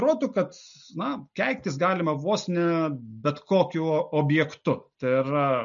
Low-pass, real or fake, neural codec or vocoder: 7.2 kHz; real; none